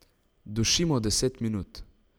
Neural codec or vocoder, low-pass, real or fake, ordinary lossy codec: none; none; real; none